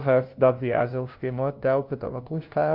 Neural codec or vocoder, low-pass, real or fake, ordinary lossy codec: codec, 16 kHz, 0.5 kbps, FunCodec, trained on LibriTTS, 25 frames a second; 5.4 kHz; fake; Opus, 32 kbps